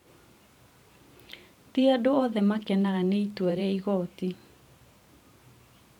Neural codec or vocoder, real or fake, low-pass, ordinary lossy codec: vocoder, 44.1 kHz, 128 mel bands every 512 samples, BigVGAN v2; fake; 19.8 kHz; none